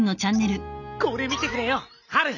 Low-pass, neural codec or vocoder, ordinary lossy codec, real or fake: 7.2 kHz; none; none; real